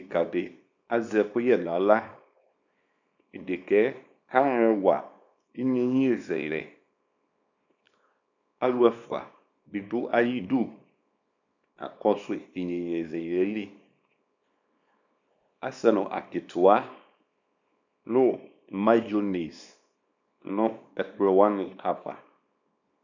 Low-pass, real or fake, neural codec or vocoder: 7.2 kHz; fake; codec, 24 kHz, 0.9 kbps, WavTokenizer, small release